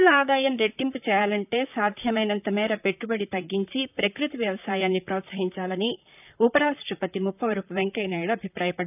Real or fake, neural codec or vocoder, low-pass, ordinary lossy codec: fake; vocoder, 44.1 kHz, 128 mel bands, Pupu-Vocoder; 3.6 kHz; none